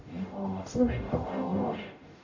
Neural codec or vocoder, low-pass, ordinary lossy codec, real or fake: codec, 44.1 kHz, 0.9 kbps, DAC; 7.2 kHz; AAC, 48 kbps; fake